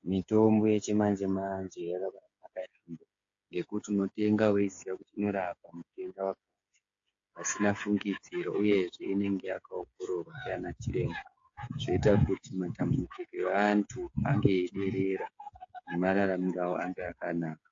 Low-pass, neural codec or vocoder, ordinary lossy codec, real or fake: 7.2 kHz; codec, 16 kHz, 8 kbps, FreqCodec, smaller model; AAC, 48 kbps; fake